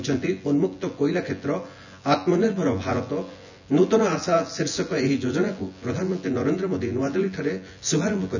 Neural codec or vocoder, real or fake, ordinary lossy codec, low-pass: vocoder, 24 kHz, 100 mel bands, Vocos; fake; none; 7.2 kHz